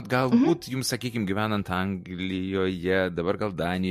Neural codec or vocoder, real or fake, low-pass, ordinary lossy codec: vocoder, 44.1 kHz, 128 mel bands every 512 samples, BigVGAN v2; fake; 14.4 kHz; MP3, 64 kbps